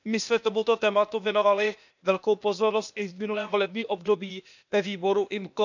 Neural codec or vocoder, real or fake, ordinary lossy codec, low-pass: codec, 16 kHz, 0.8 kbps, ZipCodec; fake; none; 7.2 kHz